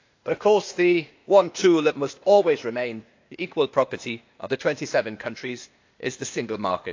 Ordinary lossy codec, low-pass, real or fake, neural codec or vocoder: AAC, 48 kbps; 7.2 kHz; fake; codec, 16 kHz, 0.8 kbps, ZipCodec